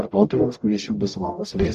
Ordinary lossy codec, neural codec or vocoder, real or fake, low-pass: MP3, 96 kbps; codec, 44.1 kHz, 0.9 kbps, DAC; fake; 14.4 kHz